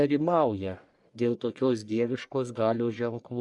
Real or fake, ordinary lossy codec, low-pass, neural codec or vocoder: fake; Opus, 64 kbps; 10.8 kHz; codec, 44.1 kHz, 1.7 kbps, Pupu-Codec